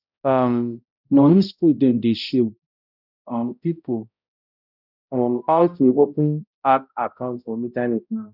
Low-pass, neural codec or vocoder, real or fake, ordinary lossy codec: 5.4 kHz; codec, 16 kHz, 0.5 kbps, X-Codec, HuBERT features, trained on balanced general audio; fake; none